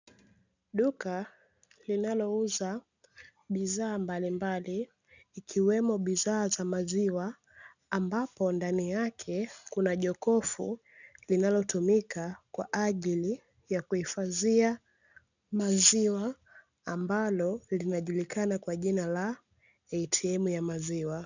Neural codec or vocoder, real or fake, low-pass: none; real; 7.2 kHz